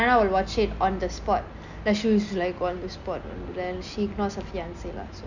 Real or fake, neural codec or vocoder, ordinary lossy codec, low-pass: real; none; none; 7.2 kHz